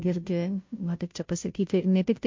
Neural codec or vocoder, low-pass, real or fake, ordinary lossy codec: codec, 16 kHz, 0.5 kbps, FunCodec, trained on Chinese and English, 25 frames a second; 7.2 kHz; fake; MP3, 48 kbps